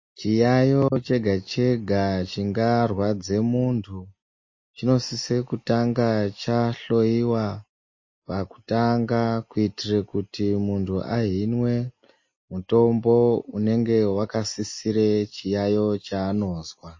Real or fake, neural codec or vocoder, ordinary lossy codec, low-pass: real; none; MP3, 32 kbps; 7.2 kHz